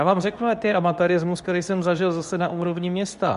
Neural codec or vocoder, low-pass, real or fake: codec, 24 kHz, 0.9 kbps, WavTokenizer, medium speech release version 2; 10.8 kHz; fake